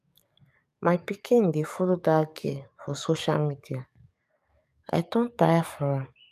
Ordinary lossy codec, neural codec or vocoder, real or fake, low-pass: none; codec, 44.1 kHz, 7.8 kbps, DAC; fake; 14.4 kHz